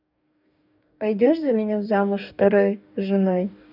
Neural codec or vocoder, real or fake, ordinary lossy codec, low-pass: codec, 44.1 kHz, 2.6 kbps, DAC; fake; none; 5.4 kHz